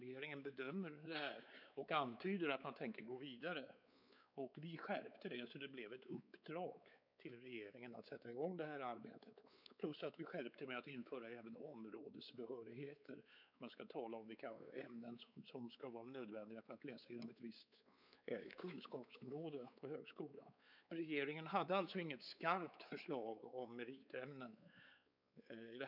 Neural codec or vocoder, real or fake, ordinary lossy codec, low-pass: codec, 16 kHz, 4 kbps, X-Codec, WavLM features, trained on Multilingual LibriSpeech; fake; none; 5.4 kHz